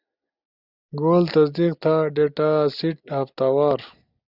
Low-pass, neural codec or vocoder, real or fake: 5.4 kHz; none; real